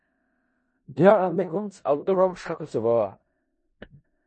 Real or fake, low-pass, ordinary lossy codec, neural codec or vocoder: fake; 10.8 kHz; MP3, 32 kbps; codec, 16 kHz in and 24 kHz out, 0.4 kbps, LongCat-Audio-Codec, four codebook decoder